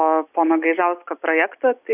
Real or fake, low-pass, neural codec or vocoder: real; 3.6 kHz; none